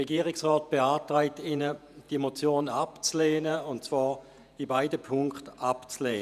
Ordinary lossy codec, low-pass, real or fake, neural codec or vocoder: none; 14.4 kHz; fake; vocoder, 48 kHz, 128 mel bands, Vocos